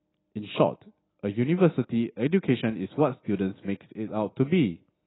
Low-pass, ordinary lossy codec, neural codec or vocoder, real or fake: 7.2 kHz; AAC, 16 kbps; none; real